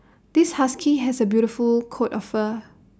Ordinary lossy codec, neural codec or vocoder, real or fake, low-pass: none; none; real; none